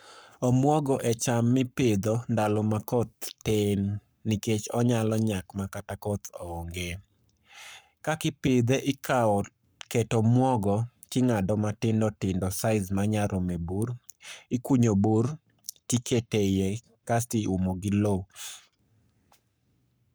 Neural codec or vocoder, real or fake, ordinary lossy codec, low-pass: codec, 44.1 kHz, 7.8 kbps, Pupu-Codec; fake; none; none